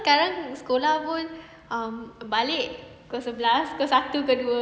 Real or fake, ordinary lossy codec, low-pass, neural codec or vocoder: real; none; none; none